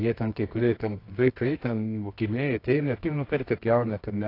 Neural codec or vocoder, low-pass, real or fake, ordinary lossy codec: codec, 24 kHz, 0.9 kbps, WavTokenizer, medium music audio release; 5.4 kHz; fake; AAC, 32 kbps